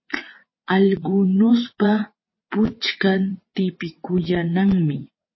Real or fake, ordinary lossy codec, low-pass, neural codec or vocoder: fake; MP3, 24 kbps; 7.2 kHz; vocoder, 22.05 kHz, 80 mel bands, WaveNeXt